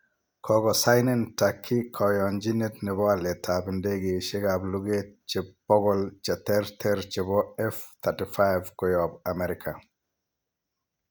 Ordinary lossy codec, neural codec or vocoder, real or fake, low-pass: none; none; real; none